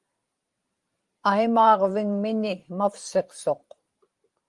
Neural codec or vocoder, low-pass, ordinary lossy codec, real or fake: vocoder, 44.1 kHz, 128 mel bands every 512 samples, BigVGAN v2; 10.8 kHz; Opus, 24 kbps; fake